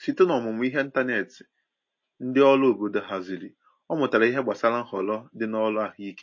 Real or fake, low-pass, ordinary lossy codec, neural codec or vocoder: real; 7.2 kHz; MP3, 32 kbps; none